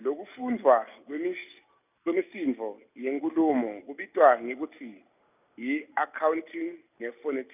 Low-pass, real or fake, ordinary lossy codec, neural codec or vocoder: 3.6 kHz; real; none; none